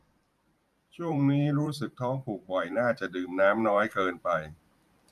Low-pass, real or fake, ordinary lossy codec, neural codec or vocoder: 14.4 kHz; fake; none; vocoder, 44.1 kHz, 128 mel bands every 512 samples, BigVGAN v2